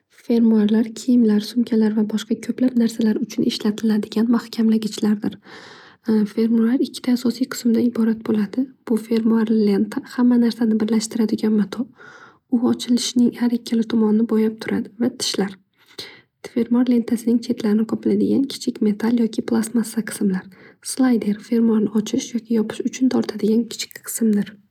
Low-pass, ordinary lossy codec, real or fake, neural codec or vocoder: 19.8 kHz; none; real; none